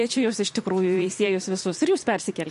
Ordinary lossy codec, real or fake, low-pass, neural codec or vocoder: MP3, 48 kbps; fake; 14.4 kHz; vocoder, 44.1 kHz, 128 mel bands every 256 samples, BigVGAN v2